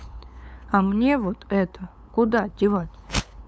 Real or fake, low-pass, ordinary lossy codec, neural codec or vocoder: fake; none; none; codec, 16 kHz, 8 kbps, FunCodec, trained on LibriTTS, 25 frames a second